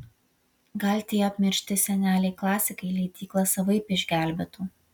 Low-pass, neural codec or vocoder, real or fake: 19.8 kHz; none; real